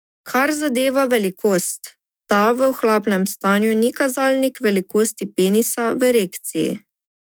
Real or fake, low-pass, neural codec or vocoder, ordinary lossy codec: fake; none; codec, 44.1 kHz, 7.8 kbps, DAC; none